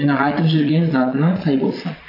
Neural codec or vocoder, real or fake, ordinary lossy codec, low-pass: vocoder, 22.05 kHz, 80 mel bands, Vocos; fake; AAC, 32 kbps; 5.4 kHz